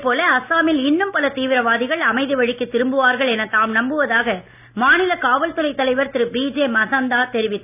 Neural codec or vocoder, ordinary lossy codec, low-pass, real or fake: none; none; 3.6 kHz; real